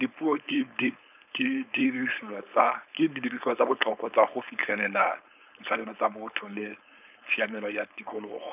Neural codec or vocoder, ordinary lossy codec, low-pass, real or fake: codec, 16 kHz, 4.8 kbps, FACodec; none; 3.6 kHz; fake